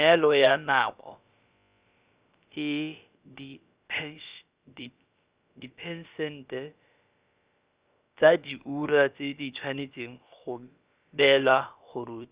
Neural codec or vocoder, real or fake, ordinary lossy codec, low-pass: codec, 16 kHz, about 1 kbps, DyCAST, with the encoder's durations; fake; Opus, 64 kbps; 3.6 kHz